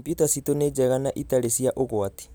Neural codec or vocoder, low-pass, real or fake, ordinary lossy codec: none; none; real; none